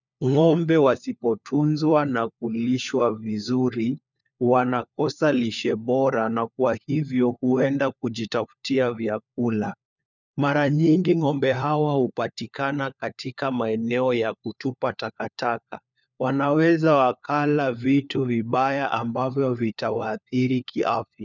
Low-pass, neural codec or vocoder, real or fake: 7.2 kHz; codec, 16 kHz, 4 kbps, FunCodec, trained on LibriTTS, 50 frames a second; fake